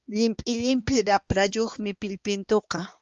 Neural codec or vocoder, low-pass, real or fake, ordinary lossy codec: codec, 16 kHz, 2 kbps, X-Codec, HuBERT features, trained on balanced general audio; 7.2 kHz; fake; Opus, 32 kbps